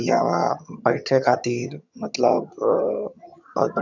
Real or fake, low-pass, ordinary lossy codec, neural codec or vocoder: fake; 7.2 kHz; none; vocoder, 22.05 kHz, 80 mel bands, HiFi-GAN